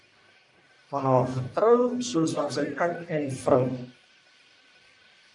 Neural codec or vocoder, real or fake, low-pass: codec, 44.1 kHz, 1.7 kbps, Pupu-Codec; fake; 10.8 kHz